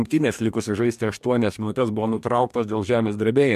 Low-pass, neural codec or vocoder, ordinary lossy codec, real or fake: 14.4 kHz; codec, 44.1 kHz, 2.6 kbps, DAC; MP3, 96 kbps; fake